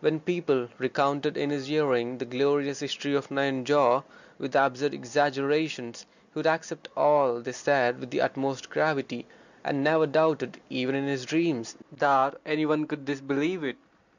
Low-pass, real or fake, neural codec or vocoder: 7.2 kHz; real; none